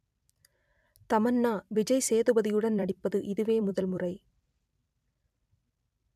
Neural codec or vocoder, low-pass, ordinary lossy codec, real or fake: vocoder, 44.1 kHz, 128 mel bands every 256 samples, BigVGAN v2; 14.4 kHz; none; fake